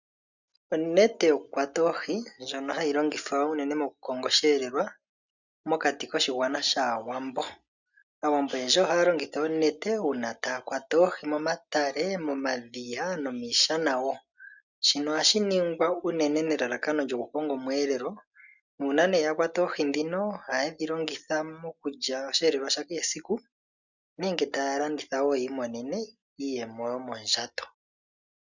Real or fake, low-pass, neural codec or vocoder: real; 7.2 kHz; none